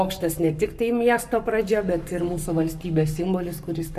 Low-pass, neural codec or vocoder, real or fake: 14.4 kHz; codec, 44.1 kHz, 7.8 kbps, Pupu-Codec; fake